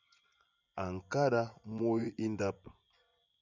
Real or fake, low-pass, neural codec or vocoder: fake; 7.2 kHz; vocoder, 24 kHz, 100 mel bands, Vocos